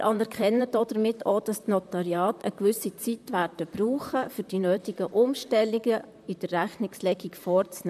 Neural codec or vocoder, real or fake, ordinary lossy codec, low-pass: vocoder, 44.1 kHz, 128 mel bands, Pupu-Vocoder; fake; MP3, 96 kbps; 14.4 kHz